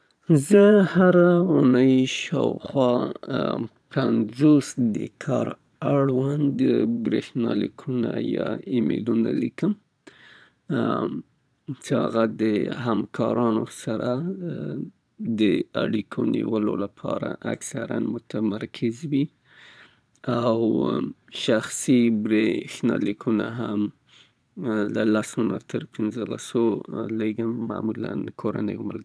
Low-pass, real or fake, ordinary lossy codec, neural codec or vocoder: none; fake; none; vocoder, 22.05 kHz, 80 mel bands, Vocos